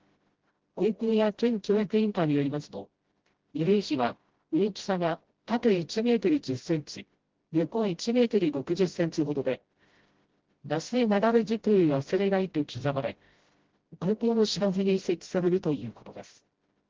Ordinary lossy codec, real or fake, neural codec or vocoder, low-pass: Opus, 16 kbps; fake; codec, 16 kHz, 0.5 kbps, FreqCodec, smaller model; 7.2 kHz